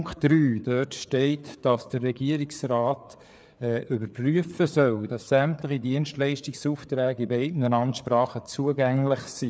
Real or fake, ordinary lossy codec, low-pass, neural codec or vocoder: fake; none; none; codec, 16 kHz, 4 kbps, FreqCodec, larger model